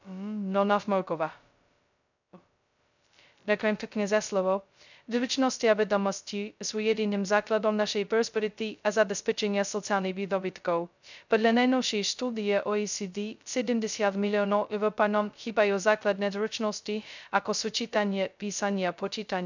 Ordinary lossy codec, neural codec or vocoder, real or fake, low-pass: none; codec, 16 kHz, 0.2 kbps, FocalCodec; fake; 7.2 kHz